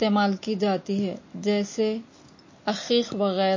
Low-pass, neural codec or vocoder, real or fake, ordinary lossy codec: 7.2 kHz; none; real; MP3, 32 kbps